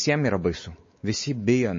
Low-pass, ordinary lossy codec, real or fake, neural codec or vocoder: 7.2 kHz; MP3, 32 kbps; fake; codec, 16 kHz, 4 kbps, X-Codec, WavLM features, trained on Multilingual LibriSpeech